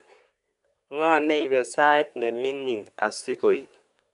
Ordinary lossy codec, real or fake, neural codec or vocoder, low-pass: none; fake; codec, 24 kHz, 1 kbps, SNAC; 10.8 kHz